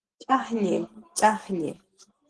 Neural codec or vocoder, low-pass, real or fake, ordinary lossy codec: none; 10.8 kHz; real; Opus, 16 kbps